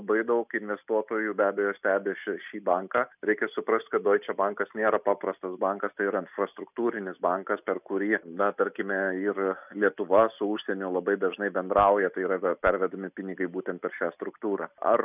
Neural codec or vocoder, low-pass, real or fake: none; 3.6 kHz; real